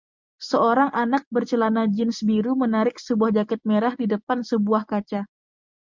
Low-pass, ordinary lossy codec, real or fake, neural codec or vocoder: 7.2 kHz; MP3, 64 kbps; real; none